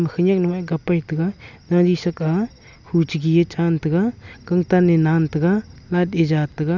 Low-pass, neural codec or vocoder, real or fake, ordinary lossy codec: 7.2 kHz; none; real; none